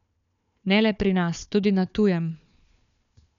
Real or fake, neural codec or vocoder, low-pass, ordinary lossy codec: fake; codec, 16 kHz, 4 kbps, FunCodec, trained on Chinese and English, 50 frames a second; 7.2 kHz; none